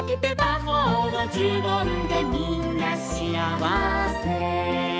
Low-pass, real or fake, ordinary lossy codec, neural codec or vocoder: none; fake; none; codec, 16 kHz, 4 kbps, X-Codec, HuBERT features, trained on balanced general audio